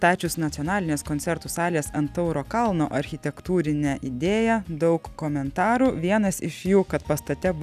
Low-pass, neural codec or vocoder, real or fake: 14.4 kHz; none; real